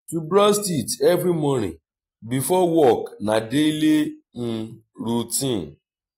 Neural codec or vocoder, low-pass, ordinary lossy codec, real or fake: none; 19.8 kHz; AAC, 48 kbps; real